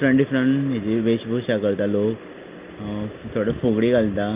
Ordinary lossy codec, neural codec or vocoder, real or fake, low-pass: Opus, 32 kbps; none; real; 3.6 kHz